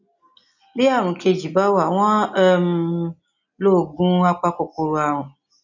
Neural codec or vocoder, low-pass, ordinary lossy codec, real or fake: none; 7.2 kHz; none; real